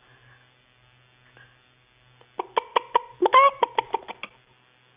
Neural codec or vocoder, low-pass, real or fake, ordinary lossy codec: none; 3.6 kHz; real; Opus, 64 kbps